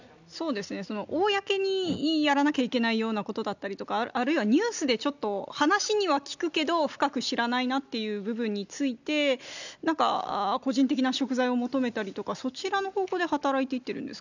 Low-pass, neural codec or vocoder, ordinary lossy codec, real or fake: 7.2 kHz; none; none; real